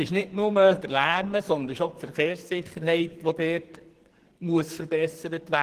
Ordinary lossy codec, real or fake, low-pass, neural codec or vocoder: Opus, 16 kbps; fake; 14.4 kHz; codec, 44.1 kHz, 2.6 kbps, SNAC